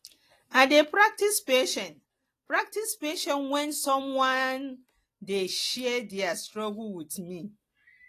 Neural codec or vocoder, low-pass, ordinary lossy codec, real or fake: none; 14.4 kHz; AAC, 48 kbps; real